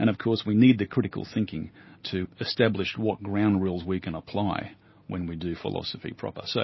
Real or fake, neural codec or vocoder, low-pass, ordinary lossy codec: real; none; 7.2 kHz; MP3, 24 kbps